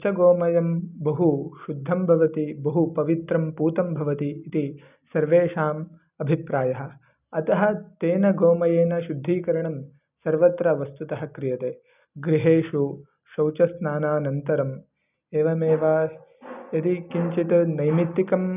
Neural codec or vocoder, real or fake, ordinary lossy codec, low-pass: none; real; none; 3.6 kHz